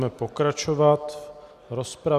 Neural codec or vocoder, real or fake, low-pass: none; real; 14.4 kHz